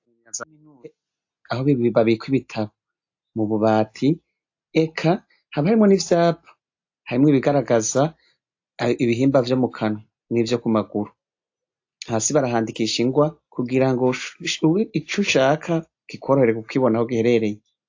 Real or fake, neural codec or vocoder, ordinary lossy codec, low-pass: real; none; AAC, 48 kbps; 7.2 kHz